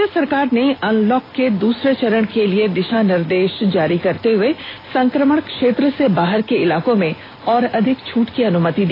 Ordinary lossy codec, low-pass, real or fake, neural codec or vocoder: AAC, 24 kbps; 5.4 kHz; real; none